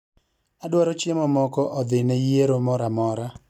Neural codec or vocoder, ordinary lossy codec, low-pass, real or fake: none; none; 19.8 kHz; real